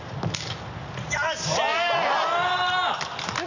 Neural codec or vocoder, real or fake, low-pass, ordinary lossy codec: none; real; 7.2 kHz; none